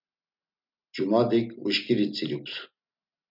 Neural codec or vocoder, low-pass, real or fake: none; 5.4 kHz; real